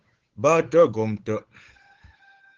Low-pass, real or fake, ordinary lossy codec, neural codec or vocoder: 7.2 kHz; fake; Opus, 16 kbps; codec, 16 kHz, 8 kbps, FunCodec, trained on Chinese and English, 25 frames a second